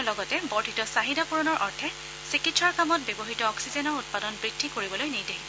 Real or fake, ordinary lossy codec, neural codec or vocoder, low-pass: real; none; none; none